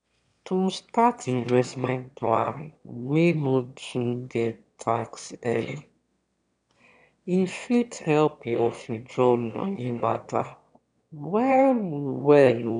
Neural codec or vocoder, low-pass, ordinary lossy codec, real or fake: autoencoder, 22.05 kHz, a latent of 192 numbers a frame, VITS, trained on one speaker; 9.9 kHz; none; fake